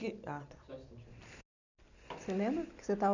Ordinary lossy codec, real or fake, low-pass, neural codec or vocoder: none; real; 7.2 kHz; none